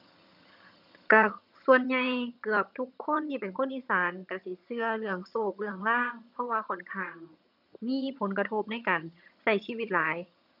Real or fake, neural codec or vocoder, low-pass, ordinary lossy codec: fake; vocoder, 22.05 kHz, 80 mel bands, HiFi-GAN; 5.4 kHz; none